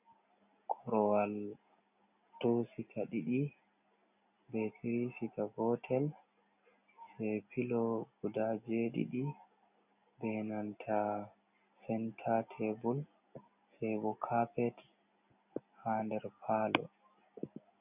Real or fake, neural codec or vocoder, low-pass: real; none; 3.6 kHz